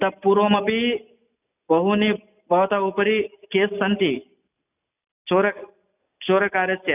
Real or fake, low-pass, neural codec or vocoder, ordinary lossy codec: real; 3.6 kHz; none; none